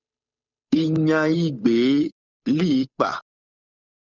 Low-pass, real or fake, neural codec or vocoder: 7.2 kHz; fake; codec, 16 kHz, 8 kbps, FunCodec, trained on Chinese and English, 25 frames a second